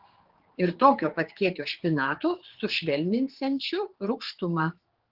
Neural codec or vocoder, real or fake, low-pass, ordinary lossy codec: codec, 16 kHz, 4 kbps, X-Codec, HuBERT features, trained on general audio; fake; 5.4 kHz; Opus, 16 kbps